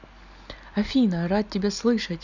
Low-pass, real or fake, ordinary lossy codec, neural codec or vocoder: 7.2 kHz; real; none; none